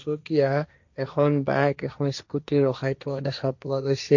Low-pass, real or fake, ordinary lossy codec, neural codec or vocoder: 7.2 kHz; fake; none; codec, 16 kHz, 1.1 kbps, Voila-Tokenizer